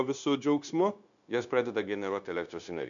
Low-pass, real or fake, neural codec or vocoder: 7.2 kHz; fake; codec, 16 kHz, 0.9 kbps, LongCat-Audio-Codec